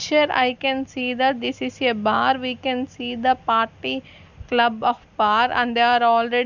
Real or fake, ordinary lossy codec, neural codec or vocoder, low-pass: real; none; none; 7.2 kHz